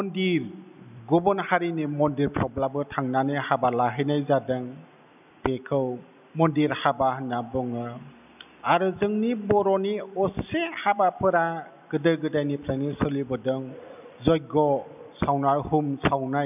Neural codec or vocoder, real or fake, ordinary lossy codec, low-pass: none; real; none; 3.6 kHz